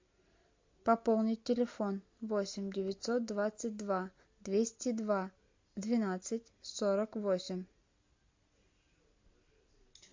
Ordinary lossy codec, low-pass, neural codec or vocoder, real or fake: MP3, 48 kbps; 7.2 kHz; none; real